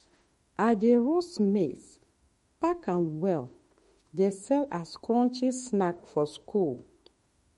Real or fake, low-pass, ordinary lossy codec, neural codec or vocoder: fake; 19.8 kHz; MP3, 48 kbps; autoencoder, 48 kHz, 32 numbers a frame, DAC-VAE, trained on Japanese speech